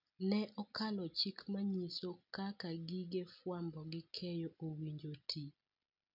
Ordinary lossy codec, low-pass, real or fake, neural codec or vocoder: none; 5.4 kHz; real; none